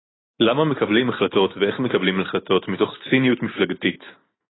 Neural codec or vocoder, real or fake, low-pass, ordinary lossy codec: none; real; 7.2 kHz; AAC, 16 kbps